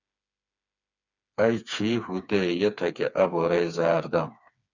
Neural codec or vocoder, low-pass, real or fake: codec, 16 kHz, 4 kbps, FreqCodec, smaller model; 7.2 kHz; fake